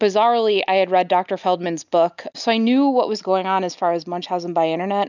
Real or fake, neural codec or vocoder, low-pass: real; none; 7.2 kHz